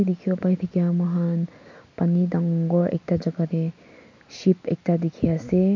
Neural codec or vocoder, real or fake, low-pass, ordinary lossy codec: none; real; 7.2 kHz; MP3, 48 kbps